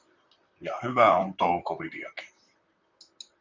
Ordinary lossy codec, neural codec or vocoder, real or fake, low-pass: MP3, 64 kbps; vocoder, 44.1 kHz, 128 mel bands, Pupu-Vocoder; fake; 7.2 kHz